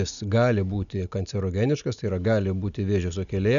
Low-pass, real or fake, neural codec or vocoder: 7.2 kHz; real; none